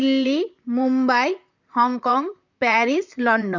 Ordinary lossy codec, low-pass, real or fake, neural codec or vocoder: none; 7.2 kHz; fake; vocoder, 44.1 kHz, 128 mel bands, Pupu-Vocoder